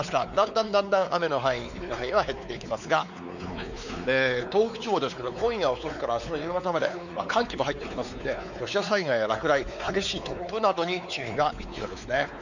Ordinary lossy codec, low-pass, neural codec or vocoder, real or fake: none; 7.2 kHz; codec, 16 kHz, 4 kbps, X-Codec, WavLM features, trained on Multilingual LibriSpeech; fake